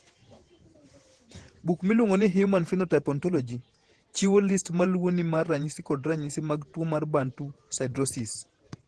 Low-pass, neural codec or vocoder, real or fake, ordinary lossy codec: 10.8 kHz; vocoder, 48 kHz, 128 mel bands, Vocos; fake; Opus, 16 kbps